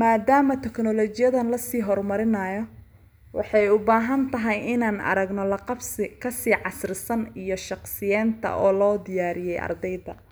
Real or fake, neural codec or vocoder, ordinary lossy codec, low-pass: real; none; none; none